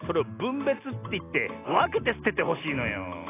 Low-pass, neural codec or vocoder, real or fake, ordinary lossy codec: 3.6 kHz; none; real; AAC, 16 kbps